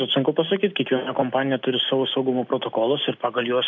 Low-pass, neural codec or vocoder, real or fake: 7.2 kHz; none; real